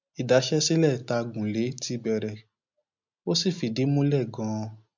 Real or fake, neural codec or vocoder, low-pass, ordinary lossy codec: real; none; 7.2 kHz; MP3, 64 kbps